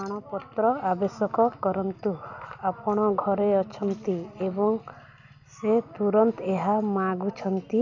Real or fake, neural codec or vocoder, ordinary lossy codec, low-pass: real; none; none; 7.2 kHz